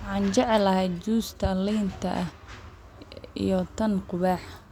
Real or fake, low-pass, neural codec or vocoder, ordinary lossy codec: real; 19.8 kHz; none; none